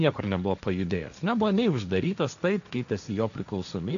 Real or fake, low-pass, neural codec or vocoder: fake; 7.2 kHz; codec, 16 kHz, 1.1 kbps, Voila-Tokenizer